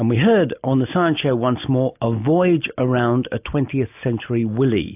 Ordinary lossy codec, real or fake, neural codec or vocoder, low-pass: AAC, 32 kbps; real; none; 3.6 kHz